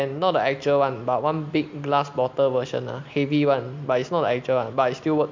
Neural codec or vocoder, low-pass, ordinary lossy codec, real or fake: none; 7.2 kHz; MP3, 64 kbps; real